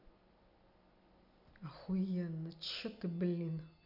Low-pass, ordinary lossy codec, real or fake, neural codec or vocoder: 5.4 kHz; none; real; none